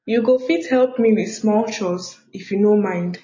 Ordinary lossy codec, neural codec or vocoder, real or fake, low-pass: MP3, 32 kbps; none; real; 7.2 kHz